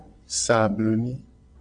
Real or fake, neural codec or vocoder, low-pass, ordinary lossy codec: fake; vocoder, 22.05 kHz, 80 mel bands, WaveNeXt; 9.9 kHz; AAC, 64 kbps